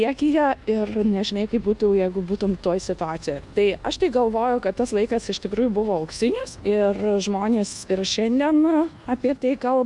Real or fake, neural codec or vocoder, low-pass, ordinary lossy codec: fake; codec, 24 kHz, 1.2 kbps, DualCodec; 10.8 kHz; Opus, 64 kbps